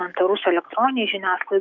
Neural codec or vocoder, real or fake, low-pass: autoencoder, 48 kHz, 128 numbers a frame, DAC-VAE, trained on Japanese speech; fake; 7.2 kHz